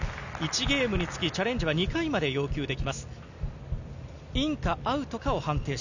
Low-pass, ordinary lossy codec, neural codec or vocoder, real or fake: 7.2 kHz; none; none; real